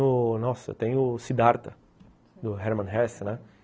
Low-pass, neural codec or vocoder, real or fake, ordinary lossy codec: none; none; real; none